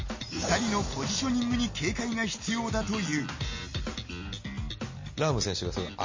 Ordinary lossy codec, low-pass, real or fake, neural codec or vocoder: MP3, 32 kbps; 7.2 kHz; real; none